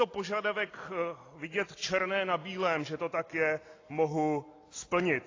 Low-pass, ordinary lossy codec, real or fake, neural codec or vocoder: 7.2 kHz; AAC, 32 kbps; real; none